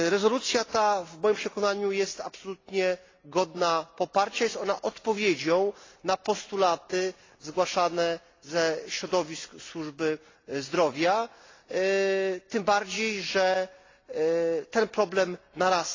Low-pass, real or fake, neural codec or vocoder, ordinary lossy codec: 7.2 kHz; real; none; AAC, 32 kbps